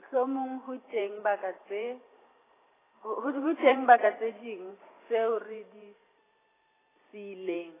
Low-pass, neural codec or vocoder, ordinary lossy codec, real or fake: 3.6 kHz; vocoder, 44.1 kHz, 128 mel bands every 512 samples, BigVGAN v2; AAC, 16 kbps; fake